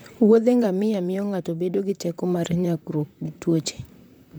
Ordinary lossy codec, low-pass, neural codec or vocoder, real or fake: none; none; vocoder, 44.1 kHz, 128 mel bands, Pupu-Vocoder; fake